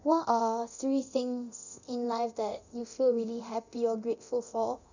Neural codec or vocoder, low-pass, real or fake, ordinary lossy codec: codec, 24 kHz, 0.9 kbps, DualCodec; 7.2 kHz; fake; none